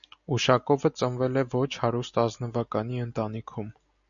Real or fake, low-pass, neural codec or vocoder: real; 7.2 kHz; none